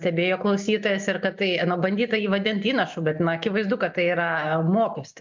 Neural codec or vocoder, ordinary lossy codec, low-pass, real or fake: none; MP3, 64 kbps; 7.2 kHz; real